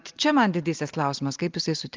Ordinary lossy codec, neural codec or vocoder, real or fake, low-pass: Opus, 32 kbps; none; real; 7.2 kHz